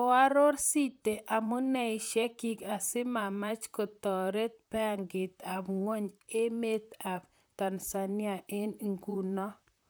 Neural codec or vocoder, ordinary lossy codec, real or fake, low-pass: vocoder, 44.1 kHz, 128 mel bands, Pupu-Vocoder; none; fake; none